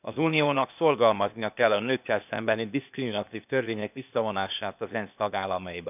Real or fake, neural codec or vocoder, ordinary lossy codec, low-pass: fake; codec, 16 kHz, 0.8 kbps, ZipCodec; none; 3.6 kHz